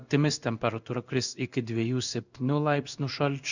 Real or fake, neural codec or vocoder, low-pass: fake; codec, 16 kHz in and 24 kHz out, 1 kbps, XY-Tokenizer; 7.2 kHz